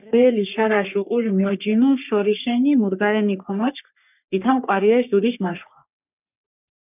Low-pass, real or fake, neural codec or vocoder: 3.6 kHz; fake; codec, 44.1 kHz, 3.4 kbps, Pupu-Codec